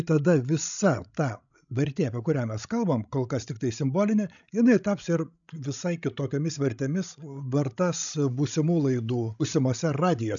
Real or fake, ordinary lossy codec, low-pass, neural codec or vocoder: fake; MP3, 96 kbps; 7.2 kHz; codec, 16 kHz, 16 kbps, FreqCodec, larger model